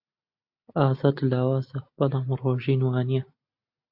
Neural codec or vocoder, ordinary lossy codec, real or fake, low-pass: none; AAC, 48 kbps; real; 5.4 kHz